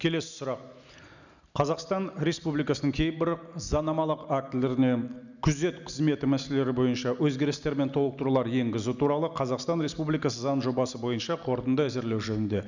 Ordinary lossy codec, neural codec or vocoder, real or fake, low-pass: none; none; real; 7.2 kHz